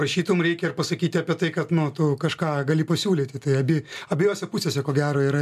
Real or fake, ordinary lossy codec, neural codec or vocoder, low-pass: real; MP3, 96 kbps; none; 14.4 kHz